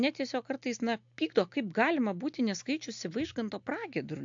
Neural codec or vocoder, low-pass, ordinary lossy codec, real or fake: none; 7.2 kHz; AAC, 64 kbps; real